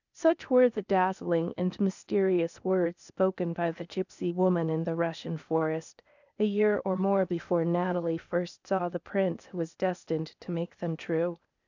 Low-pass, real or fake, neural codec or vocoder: 7.2 kHz; fake; codec, 16 kHz, 0.8 kbps, ZipCodec